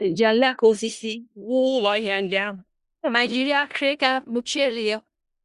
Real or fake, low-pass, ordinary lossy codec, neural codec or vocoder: fake; 10.8 kHz; Opus, 64 kbps; codec, 16 kHz in and 24 kHz out, 0.4 kbps, LongCat-Audio-Codec, four codebook decoder